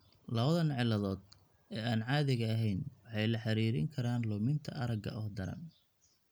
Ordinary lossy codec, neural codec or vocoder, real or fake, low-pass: none; none; real; none